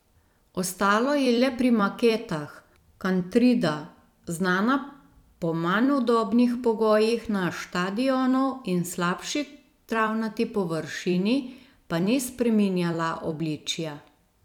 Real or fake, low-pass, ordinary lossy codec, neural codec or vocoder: fake; 19.8 kHz; none; vocoder, 44.1 kHz, 128 mel bands every 256 samples, BigVGAN v2